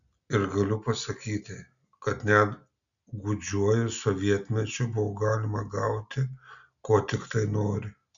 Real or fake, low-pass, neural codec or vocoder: real; 7.2 kHz; none